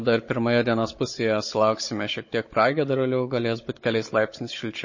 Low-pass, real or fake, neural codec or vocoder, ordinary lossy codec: 7.2 kHz; fake; codec, 16 kHz, 16 kbps, FunCodec, trained on Chinese and English, 50 frames a second; MP3, 32 kbps